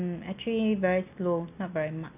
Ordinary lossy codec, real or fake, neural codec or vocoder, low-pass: none; real; none; 3.6 kHz